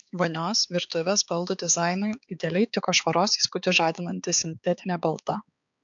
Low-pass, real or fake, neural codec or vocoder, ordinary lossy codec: 7.2 kHz; fake; codec, 16 kHz, 4 kbps, X-Codec, HuBERT features, trained on balanced general audio; AAC, 64 kbps